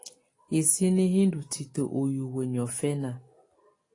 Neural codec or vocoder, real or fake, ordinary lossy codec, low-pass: none; real; AAC, 32 kbps; 10.8 kHz